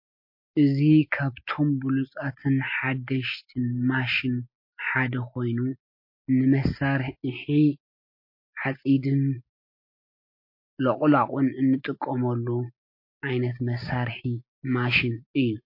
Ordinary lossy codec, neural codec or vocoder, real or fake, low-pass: MP3, 32 kbps; none; real; 5.4 kHz